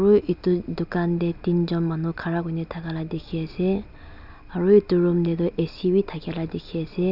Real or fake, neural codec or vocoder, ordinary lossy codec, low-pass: real; none; none; 5.4 kHz